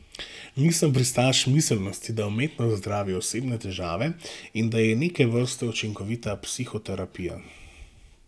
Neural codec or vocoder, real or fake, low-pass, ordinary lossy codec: none; real; none; none